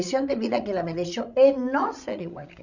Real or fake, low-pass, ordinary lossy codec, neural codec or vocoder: fake; 7.2 kHz; none; codec, 16 kHz, 16 kbps, FreqCodec, larger model